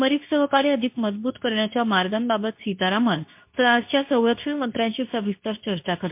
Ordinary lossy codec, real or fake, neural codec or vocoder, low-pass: MP3, 32 kbps; fake; codec, 24 kHz, 0.9 kbps, WavTokenizer, medium speech release version 2; 3.6 kHz